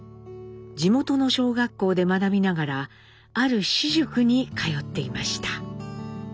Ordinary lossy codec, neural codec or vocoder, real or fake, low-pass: none; none; real; none